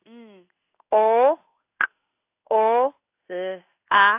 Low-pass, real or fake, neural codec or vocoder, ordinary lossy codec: 3.6 kHz; fake; codec, 16 kHz in and 24 kHz out, 1 kbps, XY-Tokenizer; AAC, 32 kbps